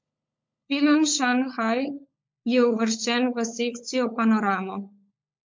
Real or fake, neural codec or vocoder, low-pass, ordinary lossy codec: fake; codec, 16 kHz, 16 kbps, FunCodec, trained on LibriTTS, 50 frames a second; 7.2 kHz; MP3, 48 kbps